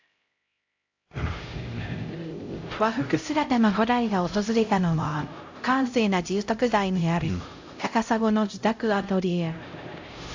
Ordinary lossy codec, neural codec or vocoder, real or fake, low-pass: AAC, 48 kbps; codec, 16 kHz, 0.5 kbps, X-Codec, HuBERT features, trained on LibriSpeech; fake; 7.2 kHz